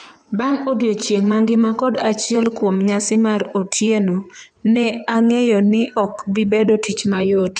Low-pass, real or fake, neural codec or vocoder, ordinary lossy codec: 9.9 kHz; fake; codec, 16 kHz in and 24 kHz out, 2.2 kbps, FireRedTTS-2 codec; none